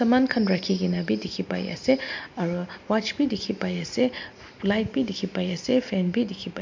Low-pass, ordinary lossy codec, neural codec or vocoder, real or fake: 7.2 kHz; MP3, 48 kbps; none; real